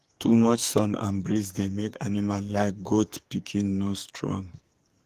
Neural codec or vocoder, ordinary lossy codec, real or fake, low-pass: codec, 44.1 kHz, 2.6 kbps, SNAC; Opus, 24 kbps; fake; 14.4 kHz